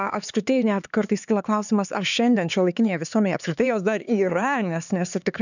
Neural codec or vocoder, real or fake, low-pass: codec, 16 kHz, 4 kbps, X-Codec, HuBERT features, trained on LibriSpeech; fake; 7.2 kHz